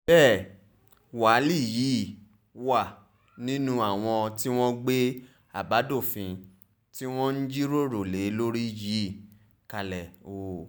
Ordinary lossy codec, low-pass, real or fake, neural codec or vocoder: none; none; real; none